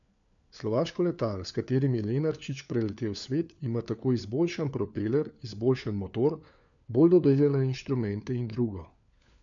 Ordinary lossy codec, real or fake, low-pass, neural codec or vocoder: none; fake; 7.2 kHz; codec, 16 kHz, 4 kbps, FunCodec, trained on LibriTTS, 50 frames a second